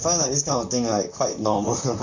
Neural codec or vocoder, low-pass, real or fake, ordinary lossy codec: vocoder, 44.1 kHz, 128 mel bands, Pupu-Vocoder; 7.2 kHz; fake; Opus, 64 kbps